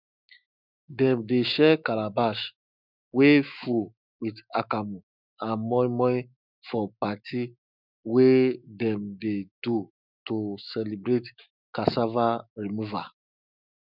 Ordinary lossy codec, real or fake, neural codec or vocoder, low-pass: none; fake; autoencoder, 48 kHz, 128 numbers a frame, DAC-VAE, trained on Japanese speech; 5.4 kHz